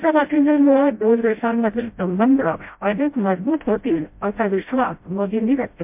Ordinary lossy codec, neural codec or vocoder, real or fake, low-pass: MP3, 32 kbps; codec, 16 kHz, 0.5 kbps, FreqCodec, smaller model; fake; 3.6 kHz